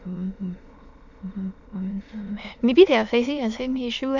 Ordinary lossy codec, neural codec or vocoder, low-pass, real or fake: none; autoencoder, 22.05 kHz, a latent of 192 numbers a frame, VITS, trained on many speakers; 7.2 kHz; fake